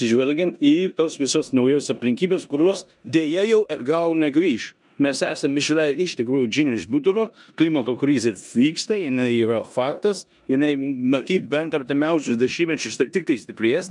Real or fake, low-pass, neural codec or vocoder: fake; 10.8 kHz; codec, 16 kHz in and 24 kHz out, 0.9 kbps, LongCat-Audio-Codec, four codebook decoder